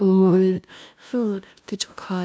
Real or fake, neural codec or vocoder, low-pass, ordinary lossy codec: fake; codec, 16 kHz, 0.5 kbps, FunCodec, trained on LibriTTS, 25 frames a second; none; none